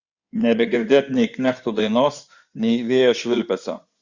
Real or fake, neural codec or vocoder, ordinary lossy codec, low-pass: fake; codec, 16 kHz in and 24 kHz out, 2.2 kbps, FireRedTTS-2 codec; Opus, 64 kbps; 7.2 kHz